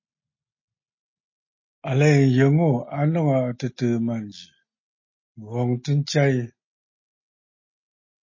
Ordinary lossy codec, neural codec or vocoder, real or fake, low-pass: MP3, 32 kbps; none; real; 7.2 kHz